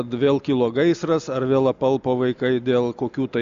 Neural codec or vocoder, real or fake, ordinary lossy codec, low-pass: none; real; Opus, 64 kbps; 7.2 kHz